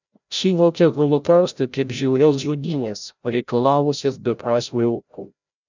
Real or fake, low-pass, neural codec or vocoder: fake; 7.2 kHz; codec, 16 kHz, 0.5 kbps, FreqCodec, larger model